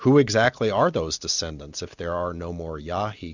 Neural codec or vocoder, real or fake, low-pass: none; real; 7.2 kHz